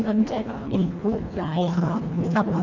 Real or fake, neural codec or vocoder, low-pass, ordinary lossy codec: fake; codec, 24 kHz, 1.5 kbps, HILCodec; 7.2 kHz; none